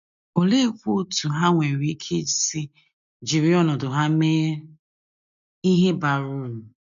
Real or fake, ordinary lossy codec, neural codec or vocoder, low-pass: real; none; none; 7.2 kHz